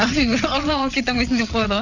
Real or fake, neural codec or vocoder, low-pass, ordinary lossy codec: fake; vocoder, 22.05 kHz, 80 mel bands, WaveNeXt; 7.2 kHz; none